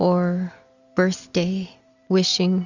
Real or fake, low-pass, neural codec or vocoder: real; 7.2 kHz; none